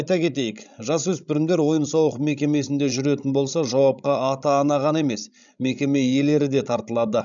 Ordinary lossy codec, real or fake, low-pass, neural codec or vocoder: none; fake; 7.2 kHz; codec, 16 kHz, 16 kbps, FreqCodec, larger model